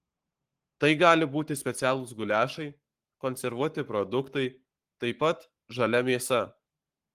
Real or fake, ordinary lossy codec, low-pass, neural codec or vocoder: fake; Opus, 24 kbps; 14.4 kHz; codec, 44.1 kHz, 7.8 kbps, Pupu-Codec